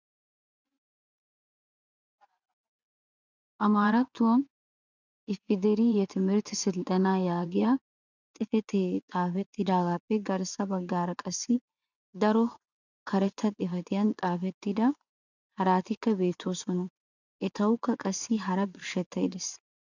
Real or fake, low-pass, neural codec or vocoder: fake; 7.2 kHz; vocoder, 24 kHz, 100 mel bands, Vocos